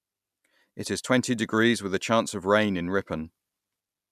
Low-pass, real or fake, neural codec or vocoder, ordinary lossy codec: 14.4 kHz; real; none; none